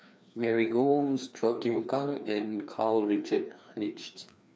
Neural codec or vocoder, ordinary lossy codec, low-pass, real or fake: codec, 16 kHz, 2 kbps, FreqCodec, larger model; none; none; fake